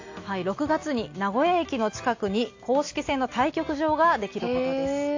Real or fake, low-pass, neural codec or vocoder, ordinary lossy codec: real; 7.2 kHz; none; AAC, 48 kbps